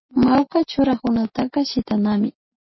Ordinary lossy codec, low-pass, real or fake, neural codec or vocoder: MP3, 24 kbps; 7.2 kHz; real; none